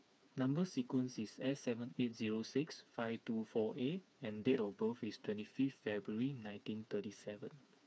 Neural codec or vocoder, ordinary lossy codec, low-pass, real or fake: codec, 16 kHz, 4 kbps, FreqCodec, smaller model; none; none; fake